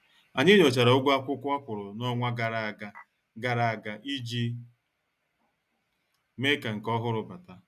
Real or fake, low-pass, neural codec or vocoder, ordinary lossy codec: real; 14.4 kHz; none; none